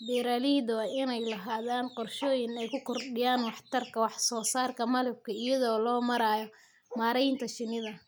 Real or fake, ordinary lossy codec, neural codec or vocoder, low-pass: real; none; none; none